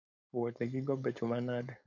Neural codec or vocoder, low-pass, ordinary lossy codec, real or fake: codec, 16 kHz, 4.8 kbps, FACodec; 7.2 kHz; MP3, 64 kbps; fake